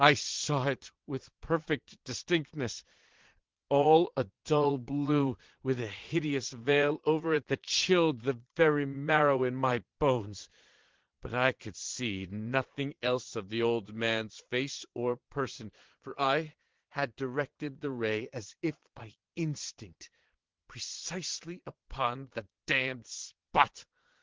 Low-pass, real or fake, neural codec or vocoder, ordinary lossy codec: 7.2 kHz; fake; vocoder, 22.05 kHz, 80 mel bands, Vocos; Opus, 16 kbps